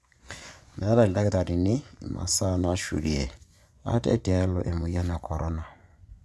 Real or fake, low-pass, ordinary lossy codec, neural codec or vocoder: fake; none; none; vocoder, 24 kHz, 100 mel bands, Vocos